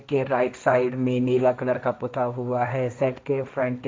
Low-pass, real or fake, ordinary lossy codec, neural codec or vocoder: none; fake; none; codec, 16 kHz, 1.1 kbps, Voila-Tokenizer